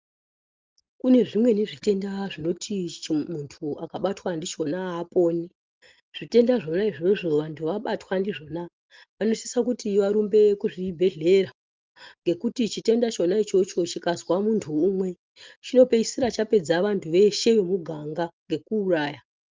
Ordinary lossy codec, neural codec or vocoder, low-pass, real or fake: Opus, 32 kbps; none; 7.2 kHz; real